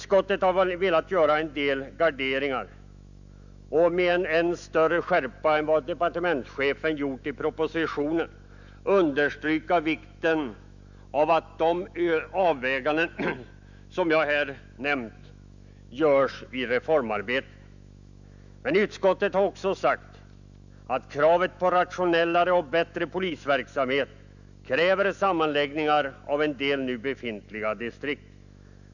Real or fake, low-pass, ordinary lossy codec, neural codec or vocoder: fake; 7.2 kHz; none; autoencoder, 48 kHz, 128 numbers a frame, DAC-VAE, trained on Japanese speech